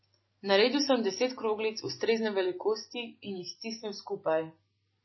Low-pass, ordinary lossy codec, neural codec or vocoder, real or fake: 7.2 kHz; MP3, 24 kbps; none; real